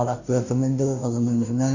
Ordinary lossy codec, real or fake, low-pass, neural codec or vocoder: none; fake; 7.2 kHz; codec, 16 kHz, 0.5 kbps, FunCodec, trained on Chinese and English, 25 frames a second